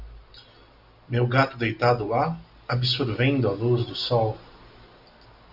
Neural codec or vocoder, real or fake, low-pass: none; real; 5.4 kHz